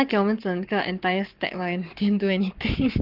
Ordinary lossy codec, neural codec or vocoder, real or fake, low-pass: Opus, 32 kbps; codec, 44.1 kHz, 7.8 kbps, Pupu-Codec; fake; 5.4 kHz